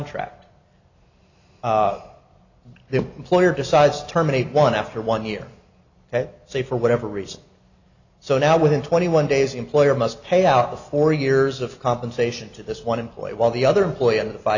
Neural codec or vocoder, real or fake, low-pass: none; real; 7.2 kHz